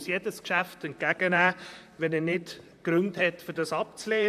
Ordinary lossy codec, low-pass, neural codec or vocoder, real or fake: MP3, 96 kbps; 14.4 kHz; vocoder, 44.1 kHz, 128 mel bands, Pupu-Vocoder; fake